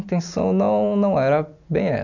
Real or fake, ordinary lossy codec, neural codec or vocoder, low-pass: real; none; none; 7.2 kHz